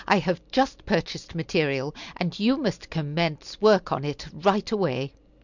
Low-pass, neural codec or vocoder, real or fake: 7.2 kHz; none; real